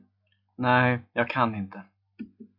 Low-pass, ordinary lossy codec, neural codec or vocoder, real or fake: 5.4 kHz; MP3, 48 kbps; none; real